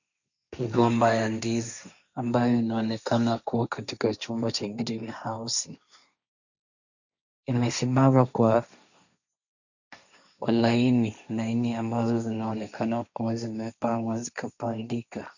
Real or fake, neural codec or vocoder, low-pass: fake; codec, 16 kHz, 1.1 kbps, Voila-Tokenizer; 7.2 kHz